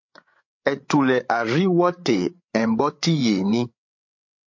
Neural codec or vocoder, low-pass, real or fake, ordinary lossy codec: codec, 16 kHz, 8 kbps, FreqCodec, larger model; 7.2 kHz; fake; MP3, 48 kbps